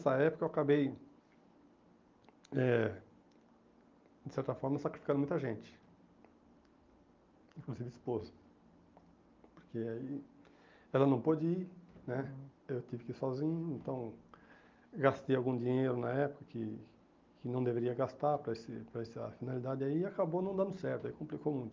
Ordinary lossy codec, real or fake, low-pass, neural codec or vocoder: Opus, 32 kbps; real; 7.2 kHz; none